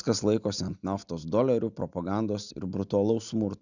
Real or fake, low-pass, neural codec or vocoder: real; 7.2 kHz; none